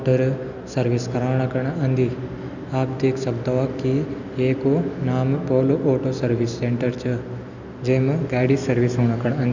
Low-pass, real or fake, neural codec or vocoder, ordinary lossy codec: 7.2 kHz; real; none; none